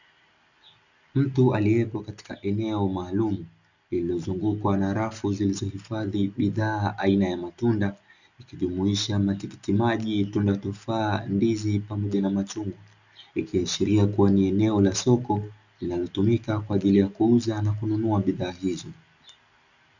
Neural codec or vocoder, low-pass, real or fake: none; 7.2 kHz; real